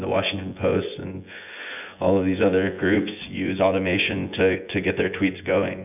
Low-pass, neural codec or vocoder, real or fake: 3.6 kHz; vocoder, 24 kHz, 100 mel bands, Vocos; fake